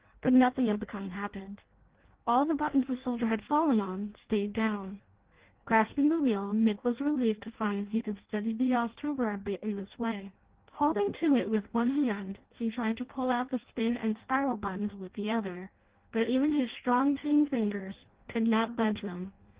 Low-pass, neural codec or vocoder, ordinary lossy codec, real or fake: 3.6 kHz; codec, 16 kHz in and 24 kHz out, 0.6 kbps, FireRedTTS-2 codec; Opus, 16 kbps; fake